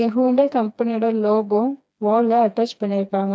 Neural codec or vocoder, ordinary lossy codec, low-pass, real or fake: codec, 16 kHz, 2 kbps, FreqCodec, smaller model; none; none; fake